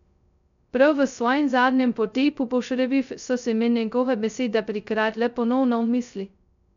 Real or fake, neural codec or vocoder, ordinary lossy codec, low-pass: fake; codec, 16 kHz, 0.2 kbps, FocalCodec; none; 7.2 kHz